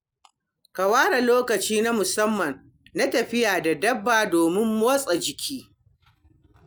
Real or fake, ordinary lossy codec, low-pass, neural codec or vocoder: real; none; none; none